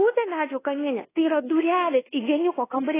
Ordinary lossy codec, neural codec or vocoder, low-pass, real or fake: AAC, 16 kbps; codec, 24 kHz, 1.2 kbps, DualCodec; 3.6 kHz; fake